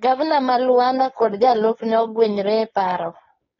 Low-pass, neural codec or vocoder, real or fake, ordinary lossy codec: 7.2 kHz; codec, 16 kHz, 4.8 kbps, FACodec; fake; AAC, 24 kbps